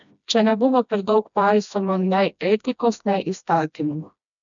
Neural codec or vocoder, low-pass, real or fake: codec, 16 kHz, 1 kbps, FreqCodec, smaller model; 7.2 kHz; fake